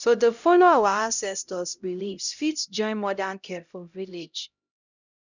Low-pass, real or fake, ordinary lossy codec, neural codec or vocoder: 7.2 kHz; fake; none; codec, 16 kHz, 0.5 kbps, X-Codec, HuBERT features, trained on LibriSpeech